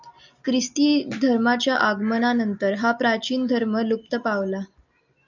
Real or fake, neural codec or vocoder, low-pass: real; none; 7.2 kHz